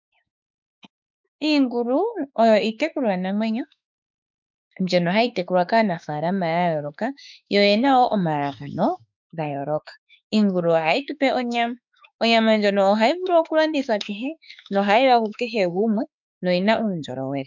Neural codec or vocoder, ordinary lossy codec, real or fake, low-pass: autoencoder, 48 kHz, 32 numbers a frame, DAC-VAE, trained on Japanese speech; MP3, 64 kbps; fake; 7.2 kHz